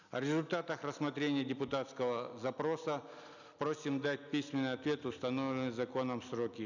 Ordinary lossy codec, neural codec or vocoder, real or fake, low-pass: none; none; real; 7.2 kHz